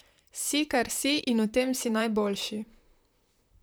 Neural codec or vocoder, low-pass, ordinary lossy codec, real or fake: vocoder, 44.1 kHz, 128 mel bands, Pupu-Vocoder; none; none; fake